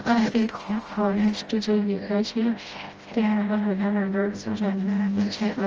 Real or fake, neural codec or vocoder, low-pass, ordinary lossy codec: fake; codec, 16 kHz, 0.5 kbps, FreqCodec, smaller model; 7.2 kHz; Opus, 24 kbps